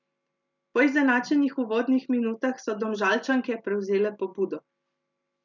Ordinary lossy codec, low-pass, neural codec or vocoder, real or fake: none; 7.2 kHz; none; real